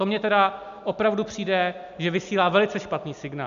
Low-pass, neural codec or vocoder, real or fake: 7.2 kHz; none; real